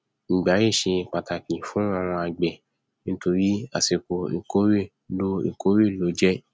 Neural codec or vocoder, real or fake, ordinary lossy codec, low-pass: none; real; none; none